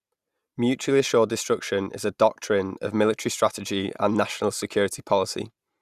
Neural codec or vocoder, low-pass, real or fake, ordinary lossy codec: none; 14.4 kHz; real; Opus, 64 kbps